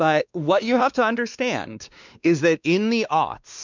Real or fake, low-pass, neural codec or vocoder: fake; 7.2 kHz; codec, 16 kHz, 2 kbps, X-Codec, WavLM features, trained on Multilingual LibriSpeech